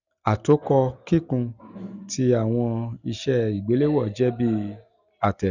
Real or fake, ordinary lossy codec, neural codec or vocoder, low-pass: real; none; none; 7.2 kHz